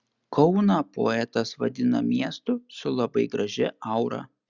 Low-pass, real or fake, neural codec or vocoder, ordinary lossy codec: 7.2 kHz; real; none; Opus, 64 kbps